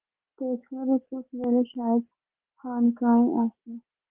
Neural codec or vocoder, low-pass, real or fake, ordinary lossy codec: none; 3.6 kHz; real; Opus, 16 kbps